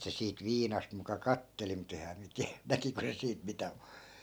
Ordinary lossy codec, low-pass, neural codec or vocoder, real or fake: none; none; none; real